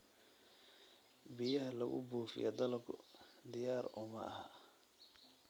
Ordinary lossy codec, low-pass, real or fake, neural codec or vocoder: none; none; fake; vocoder, 44.1 kHz, 128 mel bands every 256 samples, BigVGAN v2